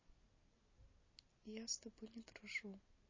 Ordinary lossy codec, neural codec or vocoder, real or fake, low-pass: MP3, 32 kbps; none; real; 7.2 kHz